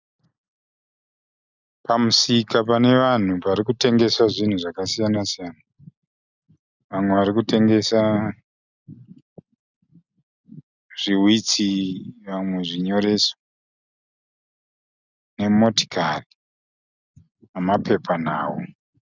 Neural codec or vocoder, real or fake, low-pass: none; real; 7.2 kHz